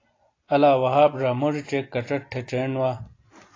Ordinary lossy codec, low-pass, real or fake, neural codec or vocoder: AAC, 32 kbps; 7.2 kHz; fake; vocoder, 44.1 kHz, 128 mel bands every 256 samples, BigVGAN v2